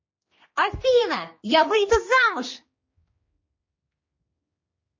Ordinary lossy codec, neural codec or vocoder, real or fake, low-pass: MP3, 32 kbps; codec, 16 kHz, 2 kbps, X-Codec, HuBERT features, trained on general audio; fake; 7.2 kHz